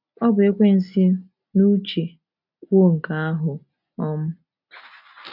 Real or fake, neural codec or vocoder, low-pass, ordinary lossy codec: real; none; 5.4 kHz; none